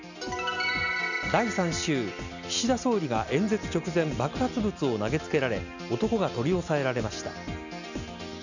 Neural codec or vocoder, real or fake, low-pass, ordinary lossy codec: none; real; 7.2 kHz; none